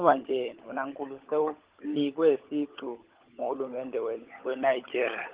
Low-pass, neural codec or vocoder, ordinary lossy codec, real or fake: 3.6 kHz; codec, 16 kHz, 16 kbps, FunCodec, trained on LibriTTS, 50 frames a second; Opus, 16 kbps; fake